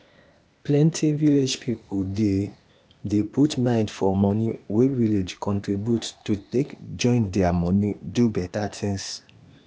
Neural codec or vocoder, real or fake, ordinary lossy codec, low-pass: codec, 16 kHz, 0.8 kbps, ZipCodec; fake; none; none